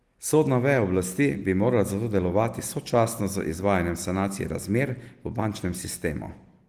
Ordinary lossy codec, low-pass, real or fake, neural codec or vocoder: Opus, 24 kbps; 14.4 kHz; real; none